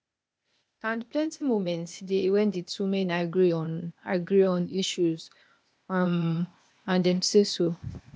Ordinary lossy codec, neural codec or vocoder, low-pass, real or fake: none; codec, 16 kHz, 0.8 kbps, ZipCodec; none; fake